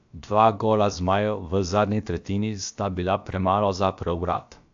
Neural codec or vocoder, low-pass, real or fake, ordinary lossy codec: codec, 16 kHz, about 1 kbps, DyCAST, with the encoder's durations; 7.2 kHz; fake; AAC, 64 kbps